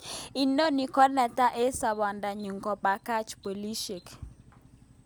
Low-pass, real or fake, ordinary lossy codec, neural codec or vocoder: none; real; none; none